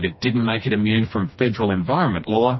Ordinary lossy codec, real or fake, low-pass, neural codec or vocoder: MP3, 24 kbps; fake; 7.2 kHz; codec, 16 kHz, 2 kbps, FreqCodec, smaller model